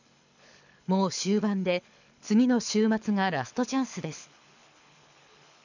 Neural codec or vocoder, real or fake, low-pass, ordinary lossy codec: codec, 24 kHz, 6 kbps, HILCodec; fake; 7.2 kHz; none